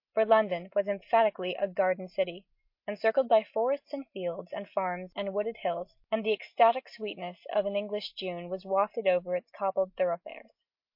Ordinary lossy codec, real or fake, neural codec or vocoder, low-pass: MP3, 32 kbps; real; none; 5.4 kHz